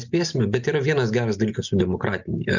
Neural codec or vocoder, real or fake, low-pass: none; real; 7.2 kHz